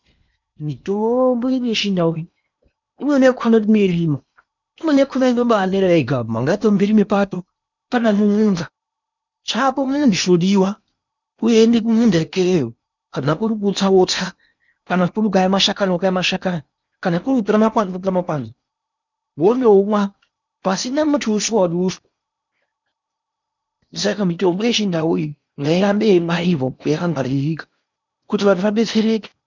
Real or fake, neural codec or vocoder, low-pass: fake; codec, 16 kHz in and 24 kHz out, 0.8 kbps, FocalCodec, streaming, 65536 codes; 7.2 kHz